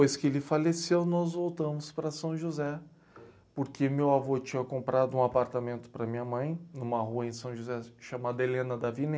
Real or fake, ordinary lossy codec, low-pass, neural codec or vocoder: real; none; none; none